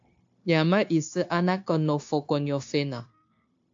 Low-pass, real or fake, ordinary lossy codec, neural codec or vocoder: 7.2 kHz; fake; AAC, 48 kbps; codec, 16 kHz, 0.9 kbps, LongCat-Audio-Codec